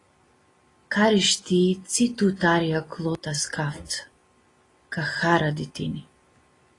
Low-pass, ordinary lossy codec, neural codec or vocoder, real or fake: 10.8 kHz; AAC, 32 kbps; none; real